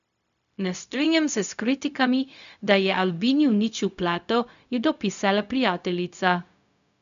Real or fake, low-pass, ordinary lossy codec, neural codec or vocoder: fake; 7.2 kHz; none; codec, 16 kHz, 0.4 kbps, LongCat-Audio-Codec